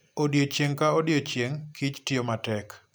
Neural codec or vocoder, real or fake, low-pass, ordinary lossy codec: none; real; none; none